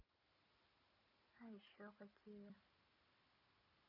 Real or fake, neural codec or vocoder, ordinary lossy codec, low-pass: real; none; none; 5.4 kHz